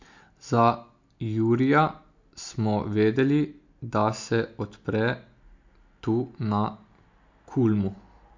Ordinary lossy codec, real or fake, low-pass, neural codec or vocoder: MP3, 48 kbps; real; 7.2 kHz; none